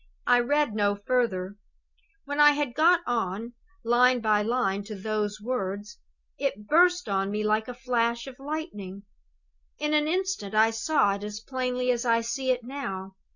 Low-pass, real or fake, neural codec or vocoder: 7.2 kHz; real; none